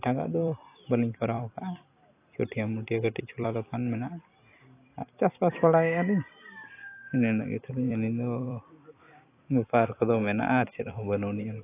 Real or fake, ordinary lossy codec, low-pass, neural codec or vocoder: fake; AAC, 24 kbps; 3.6 kHz; vocoder, 44.1 kHz, 128 mel bands every 256 samples, BigVGAN v2